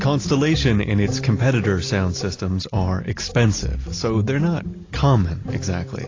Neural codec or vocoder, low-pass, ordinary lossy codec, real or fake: none; 7.2 kHz; AAC, 32 kbps; real